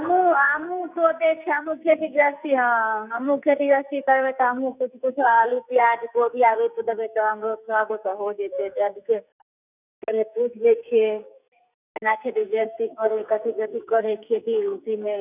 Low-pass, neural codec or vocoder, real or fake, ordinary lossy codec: 3.6 kHz; codec, 44.1 kHz, 2.6 kbps, SNAC; fake; none